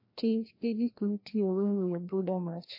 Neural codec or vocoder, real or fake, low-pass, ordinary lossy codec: codec, 16 kHz, 1 kbps, FreqCodec, larger model; fake; 5.4 kHz; MP3, 32 kbps